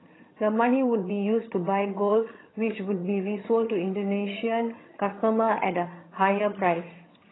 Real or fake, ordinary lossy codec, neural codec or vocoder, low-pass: fake; AAC, 16 kbps; vocoder, 22.05 kHz, 80 mel bands, HiFi-GAN; 7.2 kHz